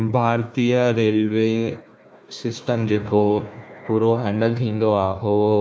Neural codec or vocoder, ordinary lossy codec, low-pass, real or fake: codec, 16 kHz, 1 kbps, FunCodec, trained on Chinese and English, 50 frames a second; none; none; fake